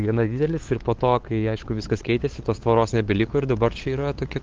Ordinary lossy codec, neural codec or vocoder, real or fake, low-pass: Opus, 16 kbps; codec, 16 kHz, 8 kbps, FunCodec, trained on LibriTTS, 25 frames a second; fake; 7.2 kHz